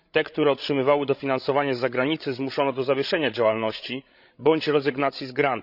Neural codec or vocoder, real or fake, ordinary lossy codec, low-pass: codec, 16 kHz, 16 kbps, FreqCodec, larger model; fake; none; 5.4 kHz